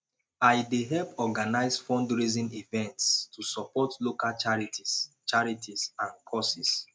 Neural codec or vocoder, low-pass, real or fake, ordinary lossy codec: none; none; real; none